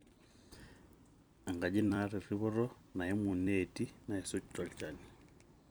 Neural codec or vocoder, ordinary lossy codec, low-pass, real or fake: vocoder, 44.1 kHz, 128 mel bands every 256 samples, BigVGAN v2; none; none; fake